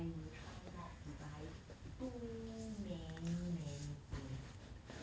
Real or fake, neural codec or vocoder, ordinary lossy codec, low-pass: real; none; none; none